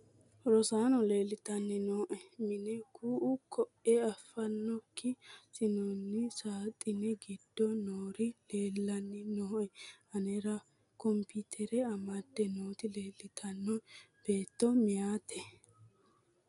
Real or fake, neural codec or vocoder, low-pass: real; none; 10.8 kHz